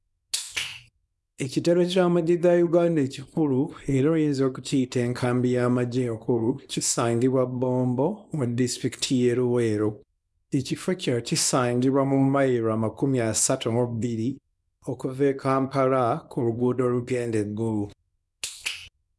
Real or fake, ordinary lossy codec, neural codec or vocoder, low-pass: fake; none; codec, 24 kHz, 0.9 kbps, WavTokenizer, small release; none